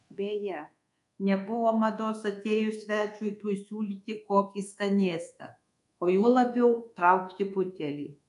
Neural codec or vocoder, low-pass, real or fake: codec, 24 kHz, 1.2 kbps, DualCodec; 10.8 kHz; fake